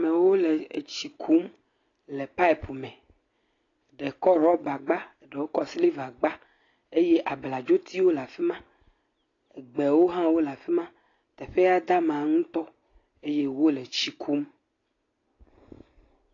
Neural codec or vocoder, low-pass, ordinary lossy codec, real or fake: none; 7.2 kHz; AAC, 32 kbps; real